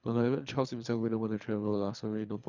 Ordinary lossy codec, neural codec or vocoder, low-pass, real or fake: none; codec, 24 kHz, 3 kbps, HILCodec; 7.2 kHz; fake